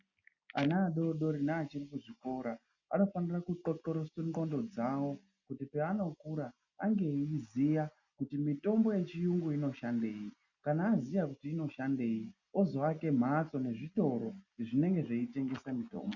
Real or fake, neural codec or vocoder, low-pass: real; none; 7.2 kHz